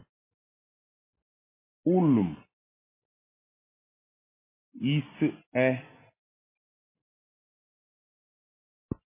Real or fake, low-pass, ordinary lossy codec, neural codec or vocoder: real; 3.6 kHz; MP3, 24 kbps; none